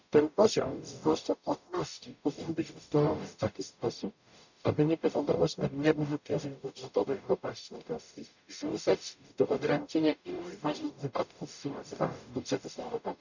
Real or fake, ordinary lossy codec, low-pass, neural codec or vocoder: fake; none; 7.2 kHz; codec, 44.1 kHz, 0.9 kbps, DAC